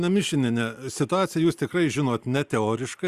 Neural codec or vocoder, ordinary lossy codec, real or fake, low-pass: none; Opus, 64 kbps; real; 14.4 kHz